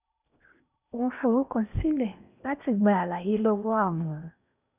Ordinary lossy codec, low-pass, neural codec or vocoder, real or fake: none; 3.6 kHz; codec, 16 kHz in and 24 kHz out, 0.8 kbps, FocalCodec, streaming, 65536 codes; fake